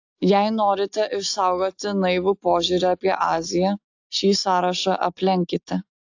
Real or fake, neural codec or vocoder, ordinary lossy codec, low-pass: real; none; AAC, 48 kbps; 7.2 kHz